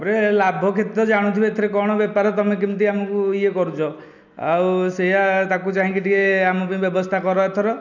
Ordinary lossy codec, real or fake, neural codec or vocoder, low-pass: none; real; none; 7.2 kHz